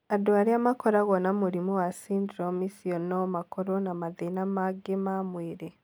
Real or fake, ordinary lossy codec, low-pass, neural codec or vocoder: real; none; none; none